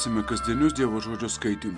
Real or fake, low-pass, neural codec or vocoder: real; 10.8 kHz; none